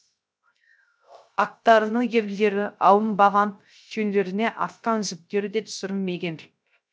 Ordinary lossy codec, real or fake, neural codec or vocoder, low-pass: none; fake; codec, 16 kHz, 0.3 kbps, FocalCodec; none